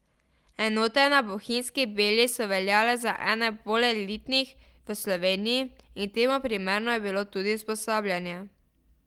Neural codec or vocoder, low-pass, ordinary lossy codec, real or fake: none; 19.8 kHz; Opus, 24 kbps; real